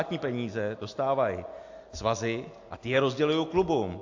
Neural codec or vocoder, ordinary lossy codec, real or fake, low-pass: none; AAC, 48 kbps; real; 7.2 kHz